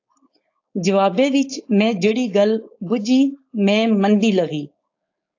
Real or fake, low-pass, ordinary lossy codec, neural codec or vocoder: fake; 7.2 kHz; AAC, 48 kbps; codec, 16 kHz, 4.8 kbps, FACodec